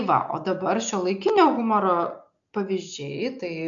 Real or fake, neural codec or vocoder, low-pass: real; none; 7.2 kHz